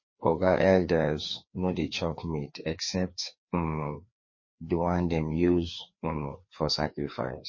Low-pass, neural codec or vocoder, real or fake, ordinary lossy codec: 7.2 kHz; codec, 16 kHz, 2 kbps, FreqCodec, larger model; fake; MP3, 32 kbps